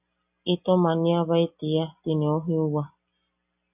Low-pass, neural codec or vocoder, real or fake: 3.6 kHz; none; real